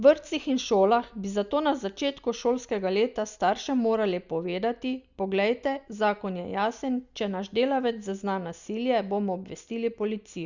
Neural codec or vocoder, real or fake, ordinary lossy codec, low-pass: none; real; Opus, 64 kbps; 7.2 kHz